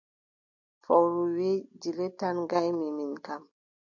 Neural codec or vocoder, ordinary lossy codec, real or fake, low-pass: none; AAC, 48 kbps; real; 7.2 kHz